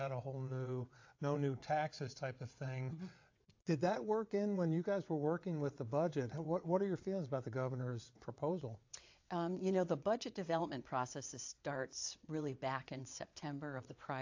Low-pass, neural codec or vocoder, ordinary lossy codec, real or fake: 7.2 kHz; vocoder, 22.05 kHz, 80 mel bands, WaveNeXt; AAC, 48 kbps; fake